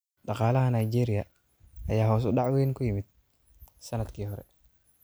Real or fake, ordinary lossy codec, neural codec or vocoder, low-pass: real; none; none; none